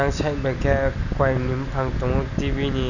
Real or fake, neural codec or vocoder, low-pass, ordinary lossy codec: real; none; 7.2 kHz; none